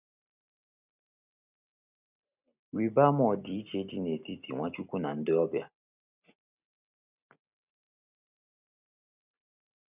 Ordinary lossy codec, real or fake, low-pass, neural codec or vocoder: none; real; 3.6 kHz; none